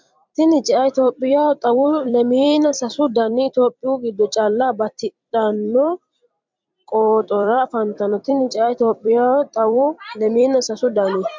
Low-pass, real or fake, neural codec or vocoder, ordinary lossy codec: 7.2 kHz; fake; vocoder, 44.1 kHz, 128 mel bands every 256 samples, BigVGAN v2; MP3, 64 kbps